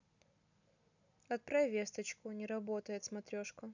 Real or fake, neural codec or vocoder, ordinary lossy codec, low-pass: real; none; none; 7.2 kHz